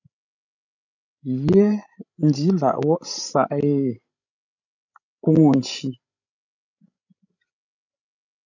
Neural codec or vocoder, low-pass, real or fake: codec, 16 kHz, 16 kbps, FreqCodec, larger model; 7.2 kHz; fake